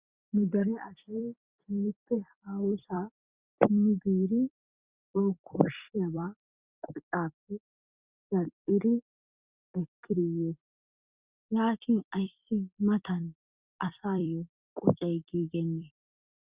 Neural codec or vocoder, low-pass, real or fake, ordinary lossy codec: vocoder, 44.1 kHz, 128 mel bands every 256 samples, BigVGAN v2; 3.6 kHz; fake; Opus, 64 kbps